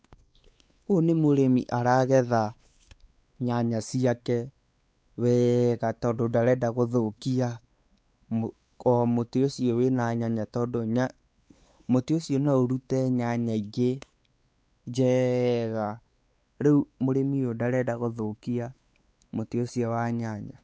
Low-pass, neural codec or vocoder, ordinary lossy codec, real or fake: none; codec, 16 kHz, 4 kbps, X-Codec, WavLM features, trained on Multilingual LibriSpeech; none; fake